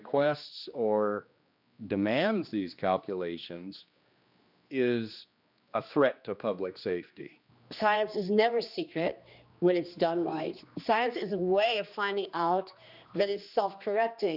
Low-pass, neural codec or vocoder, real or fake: 5.4 kHz; codec, 16 kHz, 2 kbps, X-Codec, HuBERT features, trained on general audio; fake